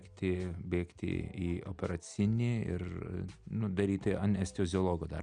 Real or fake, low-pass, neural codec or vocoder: real; 9.9 kHz; none